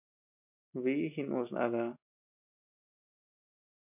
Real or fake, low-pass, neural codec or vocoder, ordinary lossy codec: real; 3.6 kHz; none; MP3, 32 kbps